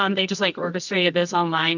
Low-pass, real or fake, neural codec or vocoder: 7.2 kHz; fake; codec, 24 kHz, 0.9 kbps, WavTokenizer, medium music audio release